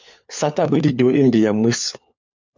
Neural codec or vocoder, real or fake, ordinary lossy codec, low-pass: codec, 16 kHz, 4 kbps, FunCodec, trained on LibriTTS, 50 frames a second; fake; MP3, 64 kbps; 7.2 kHz